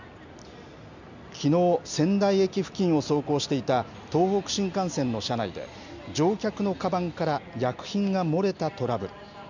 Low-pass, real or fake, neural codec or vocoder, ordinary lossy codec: 7.2 kHz; real; none; none